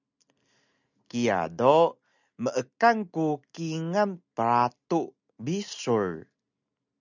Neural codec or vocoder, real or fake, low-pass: none; real; 7.2 kHz